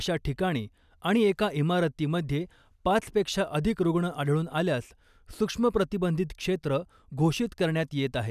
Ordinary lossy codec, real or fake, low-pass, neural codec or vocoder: none; real; 14.4 kHz; none